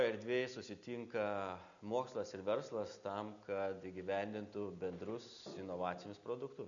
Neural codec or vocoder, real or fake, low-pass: none; real; 7.2 kHz